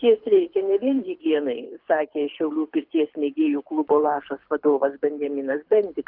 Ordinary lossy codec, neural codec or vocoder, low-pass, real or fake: Opus, 24 kbps; codec, 24 kHz, 6 kbps, HILCodec; 5.4 kHz; fake